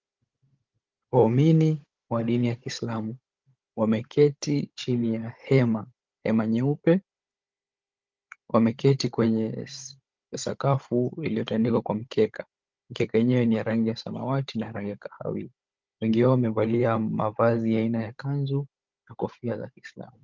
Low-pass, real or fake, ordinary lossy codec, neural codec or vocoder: 7.2 kHz; fake; Opus, 32 kbps; codec, 16 kHz, 16 kbps, FunCodec, trained on Chinese and English, 50 frames a second